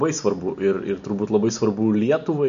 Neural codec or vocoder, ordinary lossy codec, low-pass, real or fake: none; AAC, 96 kbps; 7.2 kHz; real